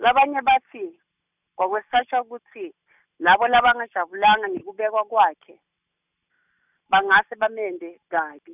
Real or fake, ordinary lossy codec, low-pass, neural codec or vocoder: real; none; 3.6 kHz; none